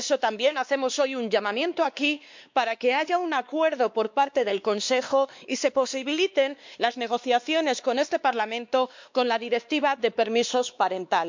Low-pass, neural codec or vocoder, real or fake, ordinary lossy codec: 7.2 kHz; codec, 16 kHz, 2 kbps, X-Codec, WavLM features, trained on Multilingual LibriSpeech; fake; MP3, 64 kbps